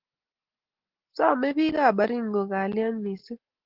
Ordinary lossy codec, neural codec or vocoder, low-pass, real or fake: Opus, 16 kbps; none; 5.4 kHz; real